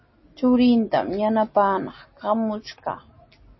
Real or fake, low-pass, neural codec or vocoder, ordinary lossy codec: real; 7.2 kHz; none; MP3, 24 kbps